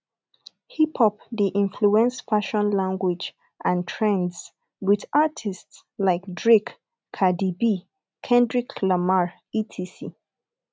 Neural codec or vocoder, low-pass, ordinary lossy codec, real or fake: none; none; none; real